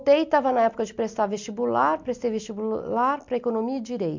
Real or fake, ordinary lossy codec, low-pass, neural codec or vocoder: real; MP3, 64 kbps; 7.2 kHz; none